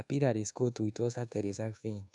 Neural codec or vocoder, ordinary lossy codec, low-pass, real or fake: codec, 24 kHz, 1.2 kbps, DualCodec; none; 10.8 kHz; fake